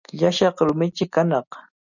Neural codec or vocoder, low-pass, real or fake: none; 7.2 kHz; real